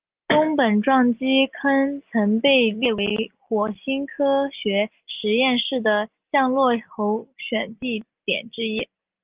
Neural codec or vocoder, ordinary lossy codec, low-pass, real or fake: none; Opus, 32 kbps; 3.6 kHz; real